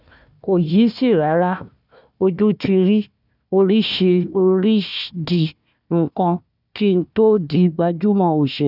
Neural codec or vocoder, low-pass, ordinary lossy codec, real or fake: codec, 16 kHz, 1 kbps, FunCodec, trained on Chinese and English, 50 frames a second; 5.4 kHz; none; fake